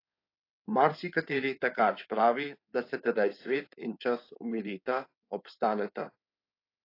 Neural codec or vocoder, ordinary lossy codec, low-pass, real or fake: codec, 16 kHz in and 24 kHz out, 2.2 kbps, FireRedTTS-2 codec; AAC, 32 kbps; 5.4 kHz; fake